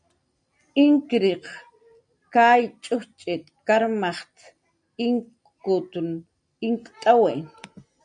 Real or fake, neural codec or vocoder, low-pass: real; none; 9.9 kHz